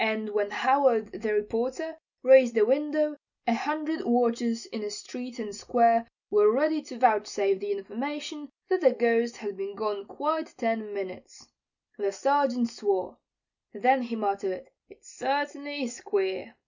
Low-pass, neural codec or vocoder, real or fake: 7.2 kHz; none; real